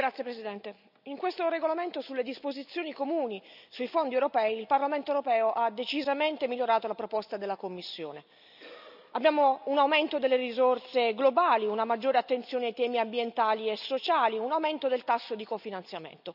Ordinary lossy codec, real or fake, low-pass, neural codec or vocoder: none; real; 5.4 kHz; none